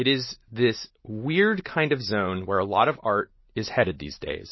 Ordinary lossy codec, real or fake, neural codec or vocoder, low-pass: MP3, 24 kbps; real; none; 7.2 kHz